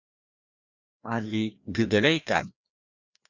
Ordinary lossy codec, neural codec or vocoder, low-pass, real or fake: Opus, 64 kbps; codec, 16 kHz, 1 kbps, FreqCodec, larger model; 7.2 kHz; fake